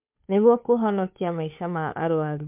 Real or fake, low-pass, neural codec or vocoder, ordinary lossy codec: fake; 3.6 kHz; codec, 16 kHz, 2 kbps, FunCodec, trained on Chinese and English, 25 frames a second; MP3, 32 kbps